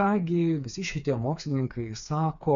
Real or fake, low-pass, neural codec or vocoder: fake; 7.2 kHz; codec, 16 kHz, 4 kbps, FreqCodec, smaller model